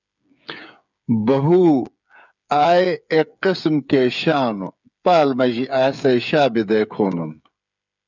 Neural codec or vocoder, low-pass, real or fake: codec, 16 kHz, 8 kbps, FreqCodec, smaller model; 7.2 kHz; fake